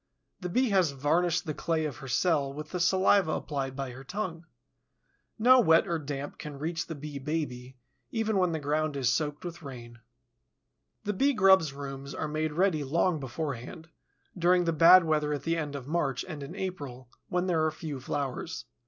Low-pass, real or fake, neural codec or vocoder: 7.2 kHz; real; none